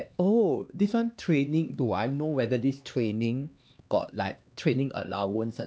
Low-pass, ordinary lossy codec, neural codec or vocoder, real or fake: none; none; codec, 16 kHz, 2 kbps, X-Codec, HuBERT features, trained on LibriSpeech; fake